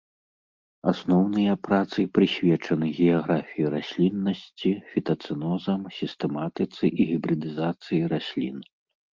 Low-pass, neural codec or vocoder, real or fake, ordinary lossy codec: 7.2 kHz; none; real; Opus, 32 kbps